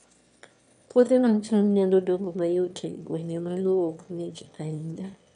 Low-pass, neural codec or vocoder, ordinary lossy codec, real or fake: 9.9 kHz; autoencoder, 22.05 kHz, a latent of 192 numbers a frame, VITS, trained on one speaker; none; fake